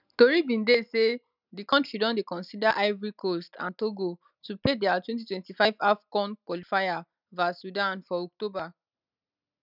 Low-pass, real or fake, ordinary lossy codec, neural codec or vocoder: 5.4 kHz; real; none; none